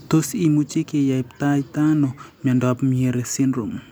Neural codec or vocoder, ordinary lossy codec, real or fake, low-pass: none; none; real; none